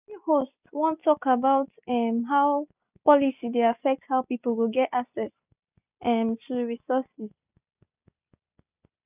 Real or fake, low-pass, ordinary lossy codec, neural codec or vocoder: real; 3.6 kHz; none; none